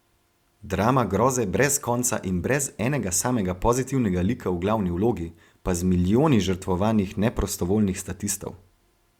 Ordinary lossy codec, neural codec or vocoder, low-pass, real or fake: Opus, 64 kbps; none; 19.8 kHz; real